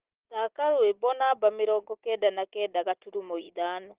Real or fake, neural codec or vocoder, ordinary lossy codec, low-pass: real; none; Opus, 16 kbps; 3.6 kHz